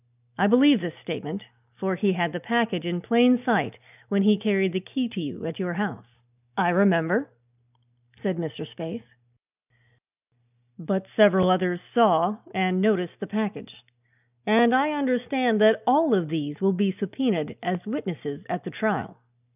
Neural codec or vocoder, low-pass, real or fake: none; 3.6 kHz; real